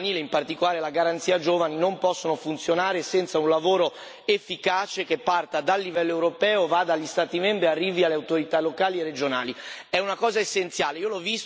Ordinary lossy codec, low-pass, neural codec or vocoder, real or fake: none; none; none; real